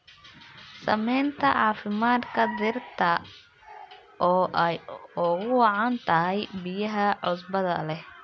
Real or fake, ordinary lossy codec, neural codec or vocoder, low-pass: real; none; none; none